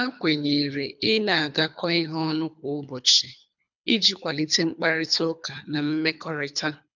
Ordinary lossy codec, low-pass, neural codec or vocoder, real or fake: none; 7.2 kHz; codec, 24 kHz, 3 kbps, HILCodec; fake